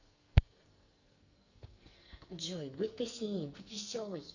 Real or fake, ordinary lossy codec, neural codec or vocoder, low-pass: fake; Opus, 64 kbps; codec, 44.1 kHz, 2.6 kbps, SNAC; 7.2 kHz